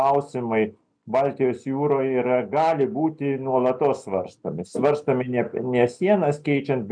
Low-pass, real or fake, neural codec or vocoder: 9.9 kHz; real; none